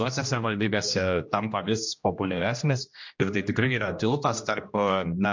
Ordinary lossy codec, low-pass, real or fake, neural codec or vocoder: MP3, 48 kbps; 7.2 kHz; fake; codec, 16 kHz, 1 kbps, X-Codec, HuBERT features, trained on general audio